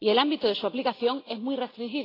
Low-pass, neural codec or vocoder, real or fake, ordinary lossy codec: 5.4 kHz; none; real; AAC, 24 kbps